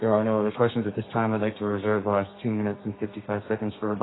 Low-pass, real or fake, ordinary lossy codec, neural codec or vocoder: 7.2 kHz; fake; AAC, 16 kbps; codec, 32 kHz, 1.9 kbps, SNAC